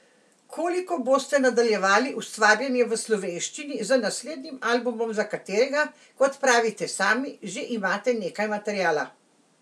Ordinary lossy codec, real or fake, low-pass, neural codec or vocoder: none; real; none; none